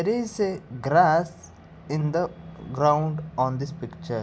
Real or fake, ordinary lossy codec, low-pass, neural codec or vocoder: real; none; none; none